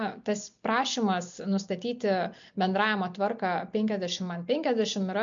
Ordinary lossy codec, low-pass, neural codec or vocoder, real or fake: MP3, 64 kbps; 7.2 kHz; none; real